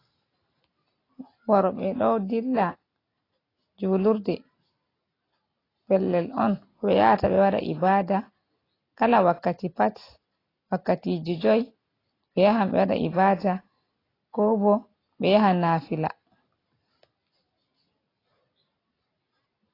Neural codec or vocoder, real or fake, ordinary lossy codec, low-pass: none; real; AAC, 24 kbps; 5.4 kHz